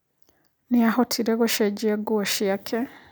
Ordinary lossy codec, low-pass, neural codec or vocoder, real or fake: none; none; none; real